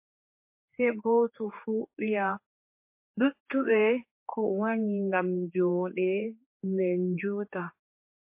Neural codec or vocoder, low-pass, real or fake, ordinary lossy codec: codec, 16 kHz, 4 kbps, X-Codec, HuBERT features, trained on general audio; 3.6 kHz; fake; MP3, 24 kbps